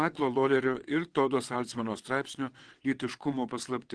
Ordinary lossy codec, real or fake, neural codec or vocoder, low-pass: Opus, 16 kbps; fake; vocoder, 22.05 kHz, 80 mel bands, WaveNeXt; 9.9 kHz